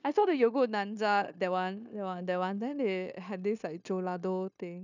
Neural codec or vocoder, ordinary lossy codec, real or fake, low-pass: codec, 16 kHz, 0.9 kbps, LongCat-Audio-Codec; none; fake; 7.2 kHz